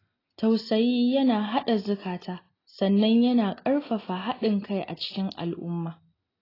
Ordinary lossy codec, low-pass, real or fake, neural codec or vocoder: AAC, 24 kbps; 5.4 kHz; real; none